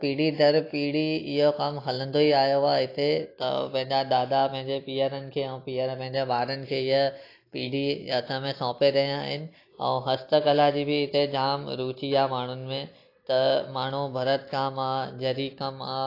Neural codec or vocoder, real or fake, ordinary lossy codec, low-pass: none; real; AAC, 32 kbps; 5.4 kHz